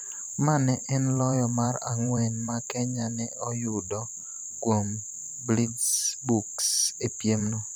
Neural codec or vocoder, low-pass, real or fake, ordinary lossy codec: vocoder, 44.1 kHz, 128 mel bands every 512 samples, BigVGAN v2; none; fake; none